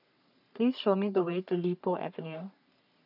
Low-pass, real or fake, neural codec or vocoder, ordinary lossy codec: 5.4 kHz; fake; codec, 44.1 kHz, 3.4 kbps, Pupu-Codec; none